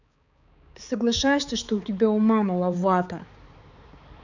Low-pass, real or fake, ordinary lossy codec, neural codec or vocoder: 7.2 kHz; fake; none; codec, 16 kHz, 4 kbps, X-Codec, HuBERT features, trained on balanced general audio